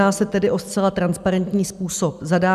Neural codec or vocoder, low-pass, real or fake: none; 14.4 kHz; real